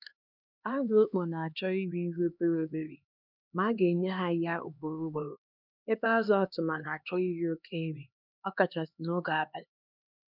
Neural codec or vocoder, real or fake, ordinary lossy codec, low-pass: codec, 16 kHz, 2 kbps, X-Codec, HuBERT features, trained on LibriSpeech; fake; none; 5.4 kHz